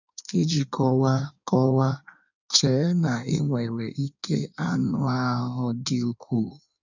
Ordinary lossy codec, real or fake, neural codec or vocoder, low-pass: none; fake; codec, 16 kHz in and 24 kHz out, 1.1 kbps, FireRedTTS-2 codec; 7.2 kHz